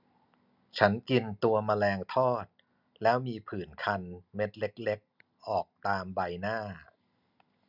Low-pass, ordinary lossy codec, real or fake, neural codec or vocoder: 5.4 kHz; none; real; none